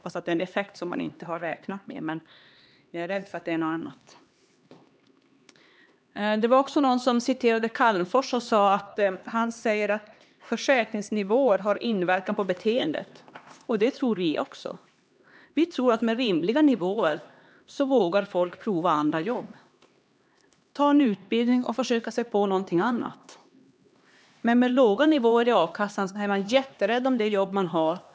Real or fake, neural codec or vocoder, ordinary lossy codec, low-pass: fake; codec, 16 kHz, 2 kbps, X-Codec, HuBERT features, trained on LibriSpeech; none; none